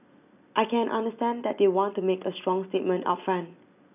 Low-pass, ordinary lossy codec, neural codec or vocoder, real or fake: 3.6 kHz; none; none; real